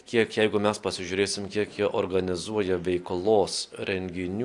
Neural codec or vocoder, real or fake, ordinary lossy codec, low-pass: none; real; AAC, 64 kbps; 10.8 kHz